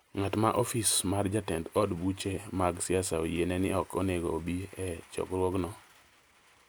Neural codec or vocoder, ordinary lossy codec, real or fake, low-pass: none; none; real; none